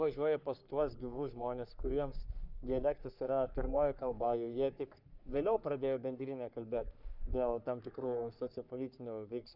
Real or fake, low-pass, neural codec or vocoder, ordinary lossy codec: fake; 5.4 kHz; codec, 44.1 kHz, 3.4 kbps, Pupu-Codec; Opus, 64 kbps